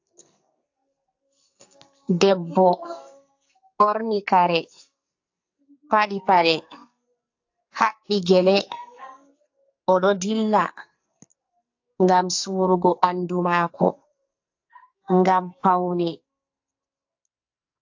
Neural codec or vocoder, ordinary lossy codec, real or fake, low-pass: codec, 44.1 kHz, 2.6 kbps, SNAC; AAC, 48 kbps; fake; 7.2 kHz